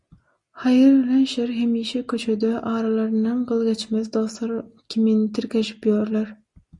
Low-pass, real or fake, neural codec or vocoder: 10.8 kHz; real; none